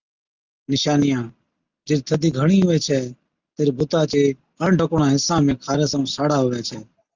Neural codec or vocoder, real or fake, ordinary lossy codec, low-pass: none; real; Opus, 32 kbps; 7.2 kHz